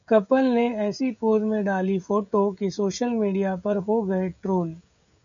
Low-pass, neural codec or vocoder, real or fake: 7.2 kHz; codec, 16 kHz, 16 kbps, FreqCodec, smaller model; fake